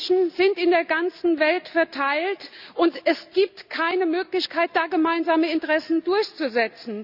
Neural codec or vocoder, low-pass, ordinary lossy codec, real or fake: none; 5.4 kHz; none; real